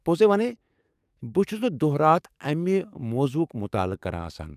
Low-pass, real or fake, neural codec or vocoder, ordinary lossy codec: 14.4 kHz; fake; codec, 44.1 kHz, 7.8 kbps, DAC; none